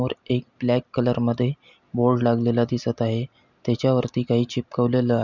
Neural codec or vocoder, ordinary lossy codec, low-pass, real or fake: none; none; 7.2 kHz; real